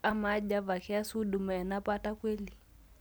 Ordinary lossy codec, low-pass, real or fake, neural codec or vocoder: none; none; real; none